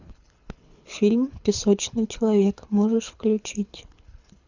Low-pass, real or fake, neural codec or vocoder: 7.2 kHz; fake; codec, 24 kHz, 6 kbps, HILCodec